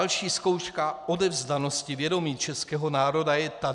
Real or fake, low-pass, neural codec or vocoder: real; 10.8 kHz; none